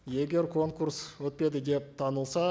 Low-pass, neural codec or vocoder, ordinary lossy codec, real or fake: none; none; none; real